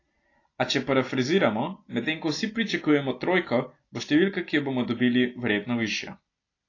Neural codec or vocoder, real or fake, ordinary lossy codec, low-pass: none; real; AAC, 32 kbps; 7.2 kHz